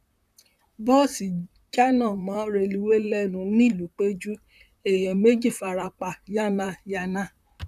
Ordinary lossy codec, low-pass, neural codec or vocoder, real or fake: none; 14.4 kHz; vocoder, 44.1 kHz, 128 mel bands, Pupu-Vocoder; fake